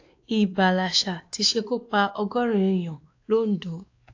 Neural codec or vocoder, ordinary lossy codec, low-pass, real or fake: codec, 16 kHz, 2 kbps, X-Codec, WavLM features, trained on Multilingual LibriSpeech; AAC, 48 kbps; 7.2 kHz; fake